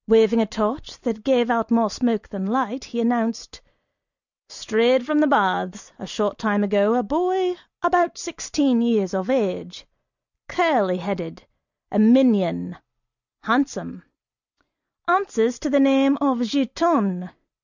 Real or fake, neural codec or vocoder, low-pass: real; none; 7.2 kHz